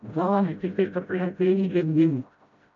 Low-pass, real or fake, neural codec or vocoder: 7.2 kHz; fake; codec, 16 kHz, 0.5 kbps, FreqCodec, smaller model